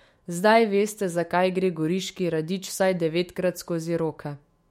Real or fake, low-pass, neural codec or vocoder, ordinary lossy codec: fake; 19.8 kHz; autoencoder, 48 kHz, 128 numbers a frame, DAC-VAE, trained on Japanese speech; MP3, 64 kbps